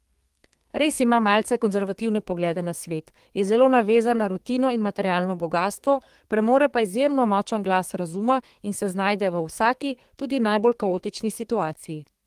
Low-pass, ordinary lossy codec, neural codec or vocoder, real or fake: 14.4 kHz; Opus, 24 kbps; codec, 32 kHz, 1.9 kbps, SNAC; fake